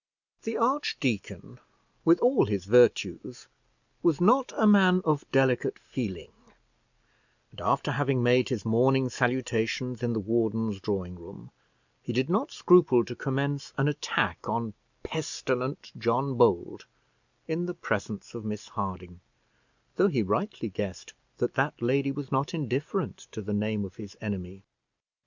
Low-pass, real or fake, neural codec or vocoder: 7.2 kHz; real; none